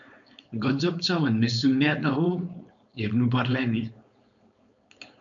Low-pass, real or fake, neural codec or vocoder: 7.2 kHz; fake; codec, 16 kHz, 4.8 kbps, FACodec